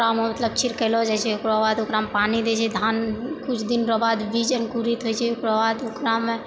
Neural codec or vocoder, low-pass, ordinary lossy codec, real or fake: none; none; none; real